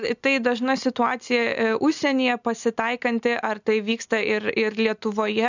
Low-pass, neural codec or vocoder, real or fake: 7.2 kHz; none; real